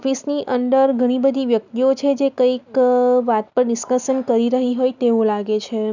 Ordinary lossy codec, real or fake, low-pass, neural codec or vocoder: none; real; 7.2 kHz; none